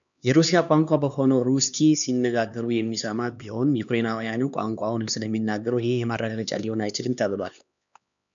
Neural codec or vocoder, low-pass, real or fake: codec, 16 kHz, 2 kbps, X-Codec, HuBERT features, trained on LibriSpeech; 7.2 kHz; fake